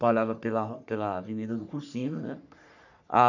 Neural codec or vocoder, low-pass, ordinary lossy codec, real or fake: codec, 44.1 kHz, 3.4 kbps, Pupu-Codec; 7.2 kHz; none; fake